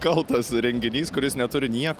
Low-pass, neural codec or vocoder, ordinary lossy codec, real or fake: 14.4 kHz; none; Opus, 32 kbps; real